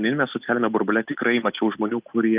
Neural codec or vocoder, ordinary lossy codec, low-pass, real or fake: none; Opus, 32 kbps; 3.6 kHz; real